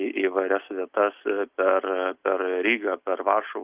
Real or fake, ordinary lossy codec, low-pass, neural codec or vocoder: real; Opus, 24 kbps; 3.6 kHz; none